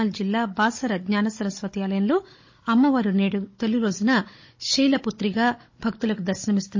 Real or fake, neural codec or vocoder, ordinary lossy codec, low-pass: fake; codec, 16 kHz, 16 kbps, FunCodec, trained on Chinese and English, 50 frames a second; MP3, 32 kbps; 7.2 kHz